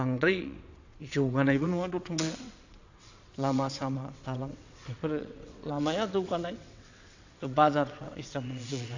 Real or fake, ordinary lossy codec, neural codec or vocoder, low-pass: fake; AAC, 48 kbps; vocoder, 22.05 kHz, 80 mel bands, WaveNeXt; 7.2 kHz